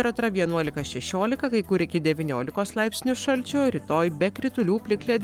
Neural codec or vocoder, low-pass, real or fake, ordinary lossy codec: codec, 44.1 kHz, 7.8 kbps, Pupu-Codec; 19.8 kHz; fake; Opus, 32 kbps